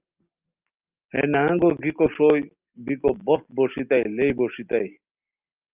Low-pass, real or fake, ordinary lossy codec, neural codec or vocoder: 3.6 kHz; real; Opus, 24 kbps; none